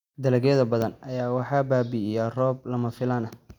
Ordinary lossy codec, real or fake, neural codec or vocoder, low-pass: none; real; none; 19.8 kHz